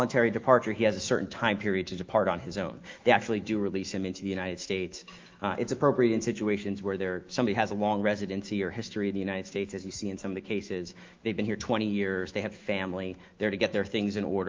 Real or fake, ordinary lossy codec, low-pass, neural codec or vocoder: fake; Opus, 32 kbps; 7.2 kHz; autoencoder, 48 kHz, 128 numbers a frame, DAC-VAE, trained on Japanese speech